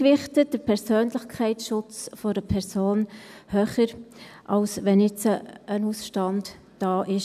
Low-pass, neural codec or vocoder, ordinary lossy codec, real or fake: 14.4 kHz; none; none; real